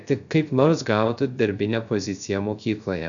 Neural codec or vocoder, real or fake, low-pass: codec, 16 kHz, 0.3 kbps, FocalCodec; fake; 7.2 kHz